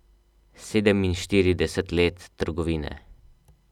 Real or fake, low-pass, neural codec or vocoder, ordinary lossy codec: real; 19.8 kHz; none; none